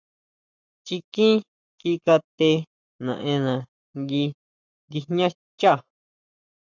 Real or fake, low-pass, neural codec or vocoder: fake; 7.2 kHz; codec, 44.1 kHz, 7.8 kbps, Pupu-Codec